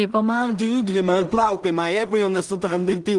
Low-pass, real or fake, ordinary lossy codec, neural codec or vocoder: 10.8 kHz; fake; Opus, 24 kbps; codec, 16 kHz in and 24 kHz out, 0.4 kbps, LongCat-Audio-Codec, two codebook decoder